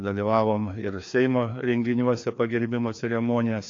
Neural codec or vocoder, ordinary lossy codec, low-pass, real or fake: codec, 16 kHz, 2 kbps, FreqCodec, larger model; AAC, 48 kbps; 7.2 kHz; fake